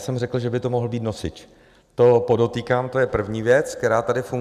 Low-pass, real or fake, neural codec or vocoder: 14.4 kHz; real; none